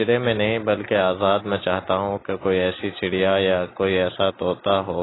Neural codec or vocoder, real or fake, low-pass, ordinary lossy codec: none; real; 7.2 kHz; AAC, 16 kbps